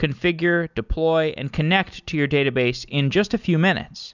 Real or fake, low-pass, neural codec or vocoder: real; 7.2 kHz; none